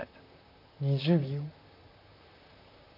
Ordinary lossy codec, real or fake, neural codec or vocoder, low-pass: none; fake; vocoder, 44.1 kHz, 128 mel bands, Pupu-Vocoder; 5.4 kHz